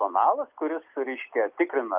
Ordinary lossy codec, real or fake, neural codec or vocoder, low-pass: Opus, 24 kbps; real; none; 3.6 kHz